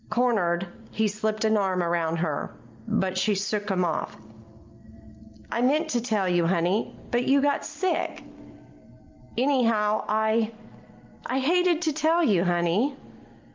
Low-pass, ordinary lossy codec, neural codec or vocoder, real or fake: 7.2 kHz; Opus, 32 kbps; none; real